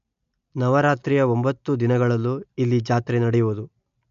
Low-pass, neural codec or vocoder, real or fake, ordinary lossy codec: 7.2 kHz; none; real; MP3, 64 kbps